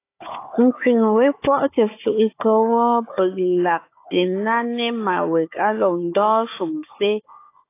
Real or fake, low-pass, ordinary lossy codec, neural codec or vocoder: fake; 3.6 kHz; AAC, 24 kbps; codec, 16 kHz, 4 kbps, FunCodec, trained on Chinese and English, 50 frames a second